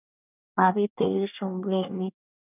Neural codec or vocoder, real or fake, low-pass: codec, 24 kHz, 1 kbps, SNAC; fake; 3.6 kHz